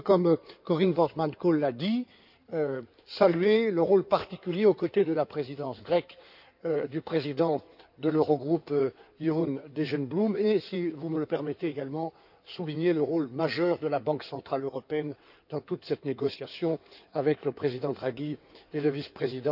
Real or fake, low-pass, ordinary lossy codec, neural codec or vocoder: fake; 5.4 kHz; none; codec, 16 kHz in and 24 kHz out, 2.2 kbps, FireRedTTS-2 codec